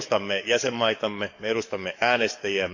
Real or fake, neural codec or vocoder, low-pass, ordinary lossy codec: fake; codec, 44.1 kHz, 7.8 kbps, DAC; 7.2 kHz; none